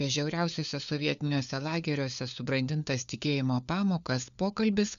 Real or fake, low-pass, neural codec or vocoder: fake; 7.2 kHz; codec, 16 kHz, 4 kbps, FunCodec, trained on LibriTTS, 50 frames a second